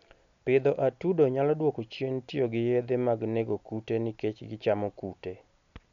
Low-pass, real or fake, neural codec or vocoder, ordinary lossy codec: 7.2 kHz; real; none; MP3, 64 kbps